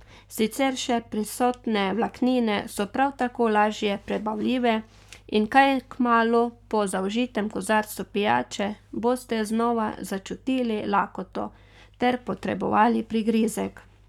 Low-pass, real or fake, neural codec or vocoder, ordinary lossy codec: 19.8 kHz; fake; codec, 44.1 kHz, 7.8 kbps, Pupu-Codec; none